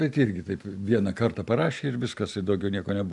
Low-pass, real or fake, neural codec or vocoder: 10.8 kHz; real; none